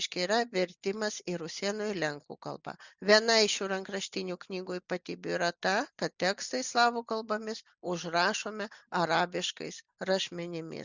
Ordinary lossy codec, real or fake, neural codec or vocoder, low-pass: Opus, 64 kbps; real; none; 7.2 kHz